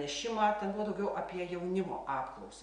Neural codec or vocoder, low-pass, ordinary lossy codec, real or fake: none; 9.9 kHz; Opus, 64 kbps; real